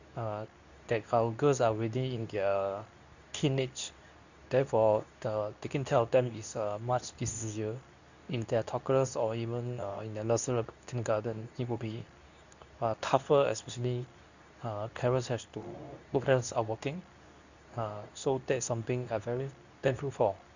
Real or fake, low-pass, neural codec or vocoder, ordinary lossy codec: fake; 7.2 kHz; codec, 24 kHz, 0.9 kbps, WavTokenizer, medium speech release version 2; none